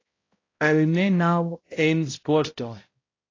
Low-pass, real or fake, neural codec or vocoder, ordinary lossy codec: 7.2 kHz; fake; codec, 16 kHz, 0.5 kbps, X-Codec, HuBERT features, trained on balanced general audio; AAC, 32 kbps